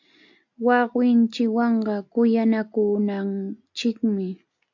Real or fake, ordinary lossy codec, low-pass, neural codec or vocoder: real; AAC, 48 kbps; 7.2 kHz; none